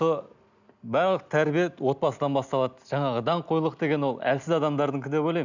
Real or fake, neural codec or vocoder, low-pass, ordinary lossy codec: real; none; 7.2 kHz; none